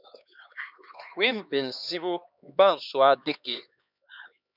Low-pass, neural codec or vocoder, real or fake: 5.4 kHz; codec, 16 kHz, 2 kbps, X-Codec, HuBERT features, trained on LibriSpeech; fake